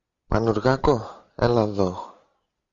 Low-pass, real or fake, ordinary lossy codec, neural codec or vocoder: 7.2 kHz; real; Opus, 64 kbps; none